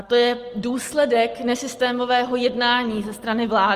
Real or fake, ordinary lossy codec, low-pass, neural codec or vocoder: real; Opus, 24 kbps; 14.4 kHz; none